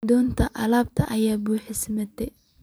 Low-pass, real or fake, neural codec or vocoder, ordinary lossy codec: none; fake; vocoder, 44.1 kHz, 128 mel bands every 256 samples, BigVGAN v2; none